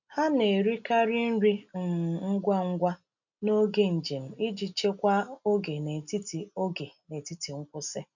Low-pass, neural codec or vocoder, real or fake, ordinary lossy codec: 7.2 kHz; none; real; none